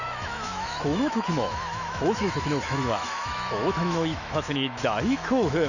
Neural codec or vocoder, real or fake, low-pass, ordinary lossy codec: autoencoder, 48 kHz, 128 numbers a frame, DAC-VAE, trained on Japanese speech; fake; 7.2 kHz; none